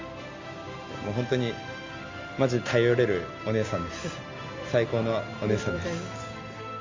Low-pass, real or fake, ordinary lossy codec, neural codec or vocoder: 7.2 kHz; real; Opus, 32 kbps; none